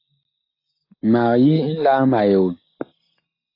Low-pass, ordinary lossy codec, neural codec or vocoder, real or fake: 5.4 kHz; AAC, 32 kbps; none; real